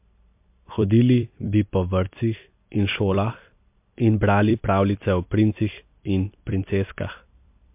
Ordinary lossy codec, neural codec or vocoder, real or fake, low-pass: MP3, 32 kbps; vocoder, 44.1 kHz, 128 mel bands every 256 samples, BigVGAN v2; fake; 3.6 kHz